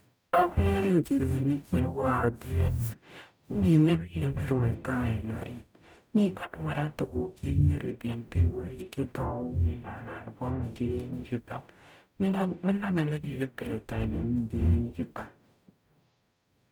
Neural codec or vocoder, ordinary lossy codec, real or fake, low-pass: codec, 44.1 kHz, 0.9 kbps, DAC; none; fake; none